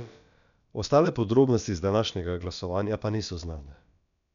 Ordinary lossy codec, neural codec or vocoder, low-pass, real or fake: none; codec, 16 kHz, about 1 kbps, DyCAST, with the encoder's durations; 7.2 kHz; fake